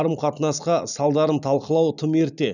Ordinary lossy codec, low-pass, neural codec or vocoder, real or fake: none; 7.2 kHz; none; real